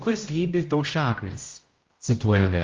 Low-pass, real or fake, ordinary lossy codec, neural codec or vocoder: 7.2 kHz; fake; Opus, 32 kbps; codec, 16 kHz, 0.5 kbps, X-Codec, HuBERT features, trained on general audio